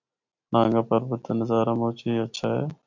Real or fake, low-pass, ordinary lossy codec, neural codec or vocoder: real; 7.2 kHz; MP3, 64 kbps; none